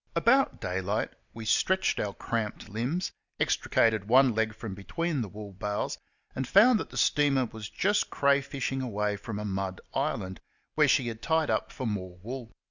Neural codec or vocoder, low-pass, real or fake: none; 7.2 kHz; real